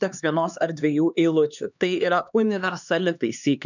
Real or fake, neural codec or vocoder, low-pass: fake; codec, 16 kHz, 2 kbps, X-Codec, HuBERT features, trained on LibriSpeech; 7.2 kHz